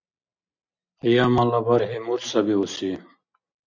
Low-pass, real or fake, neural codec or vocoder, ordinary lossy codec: 7.2 kHz; real; none; MP3, 64 kbps